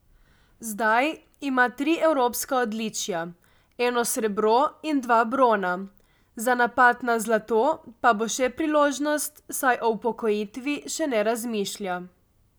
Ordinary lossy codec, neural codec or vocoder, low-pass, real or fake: none; none; none; real